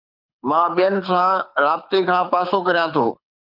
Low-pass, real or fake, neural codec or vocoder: 5.4 kHz; fake; codec, 24 kHz, 6 kbps, HILCodec